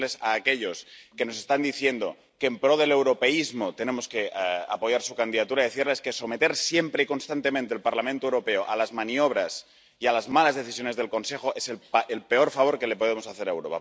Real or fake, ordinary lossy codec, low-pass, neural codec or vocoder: real; none; none; none